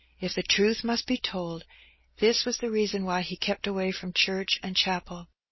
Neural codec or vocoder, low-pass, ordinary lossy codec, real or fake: none; 7.2 kHz; MP3, 24 kbps; real